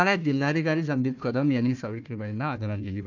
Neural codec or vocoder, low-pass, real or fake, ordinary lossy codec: codec, 16 kHz, 1 kbps, FunCodec, trained on Chinese and English, 50 frames a second; 7.2 kHz; fake; none